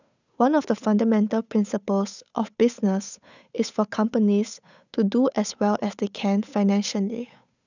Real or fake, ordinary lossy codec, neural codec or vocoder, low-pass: fake; none; codec, 16 kHz, 8 kbps, FunCodec, trained on Chinese and English, 25 frames a second; 7.2 kHz